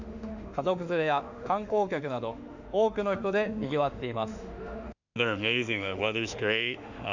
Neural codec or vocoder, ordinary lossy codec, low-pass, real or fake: autoencoder, 48 kHz, 32 numbers a frame, DAC-VAE, trained on Japanese speech; none; 7.2 kHz; fake